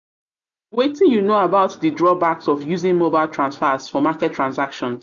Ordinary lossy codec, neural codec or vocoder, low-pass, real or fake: none; none; 7.2 kHz; real